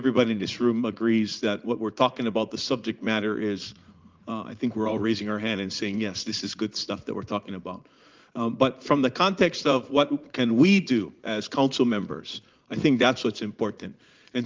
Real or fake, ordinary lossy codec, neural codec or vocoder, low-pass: fake; Opus, 32 kbps; vocoder, 44.1 kHz, 80 mel bands, Vocos; 7.2 kHz